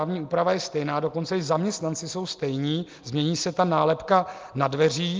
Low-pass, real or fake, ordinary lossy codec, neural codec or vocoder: 7.2 kHz; real; Opus, 32 kbps; none